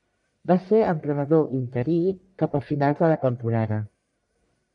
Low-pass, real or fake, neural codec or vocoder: 10.8 kHz; fake; codec, 44.1 kHz, 1.7 kbps, Pupu-Codec